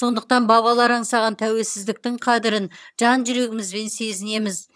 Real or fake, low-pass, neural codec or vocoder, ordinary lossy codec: fake; none; vocoder, 22.05 kHz, 80 mel bands, HiFi-GAN; none